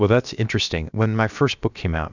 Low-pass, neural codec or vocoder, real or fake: 7.2 kHz; codec, 16 kHz, about 1 kbps, DyCAST, with the encoder's durations; fake